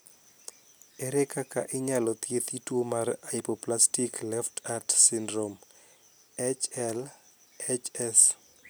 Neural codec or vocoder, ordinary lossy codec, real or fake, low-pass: none; none; real; none